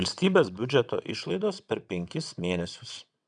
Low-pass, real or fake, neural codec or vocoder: 9.9 kHz; fake; vocoder, 22.05 kHz, 80 mel bands, WaveNeXt